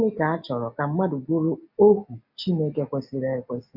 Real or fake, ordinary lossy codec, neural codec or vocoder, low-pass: real; AAC, 48 kbps; none; 5.4 kHz